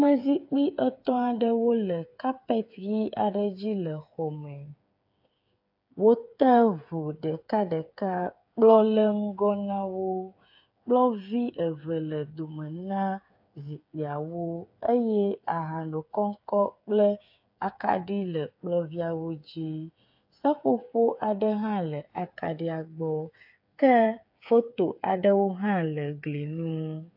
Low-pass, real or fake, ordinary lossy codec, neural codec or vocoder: 5.4 kHz; fake; AAC, 48 kbps; codec, 16 kHz, 8 kbps, FreqCodec, smaller model